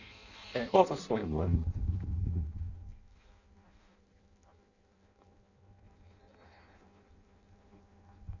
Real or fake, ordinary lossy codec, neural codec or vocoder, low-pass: fake; none; codec, 16 kHz in and 24 kHz out, 0.6 kbps, FireRedTTS-2 codec; 7.2 kHz